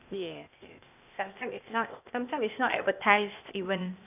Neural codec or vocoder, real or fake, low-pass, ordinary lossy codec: codec, 16 kHz, 0.8 kbps, ZipCodec; fake; 3.6 kHz; none